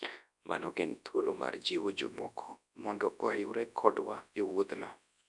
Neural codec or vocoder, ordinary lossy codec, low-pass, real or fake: codec, 24 kHz, 0.9 kbps, WavTokenizer, large speech release; none; 10.8 kHz; fake